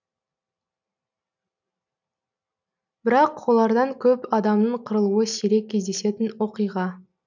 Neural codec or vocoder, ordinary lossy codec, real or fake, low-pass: none; none; real; 7.2 kHz